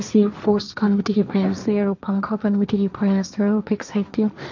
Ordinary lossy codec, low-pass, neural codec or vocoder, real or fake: none; 7.2 kHz; codec, 16 kHz, 1.1 kbps, Voila-Tokenizer; fake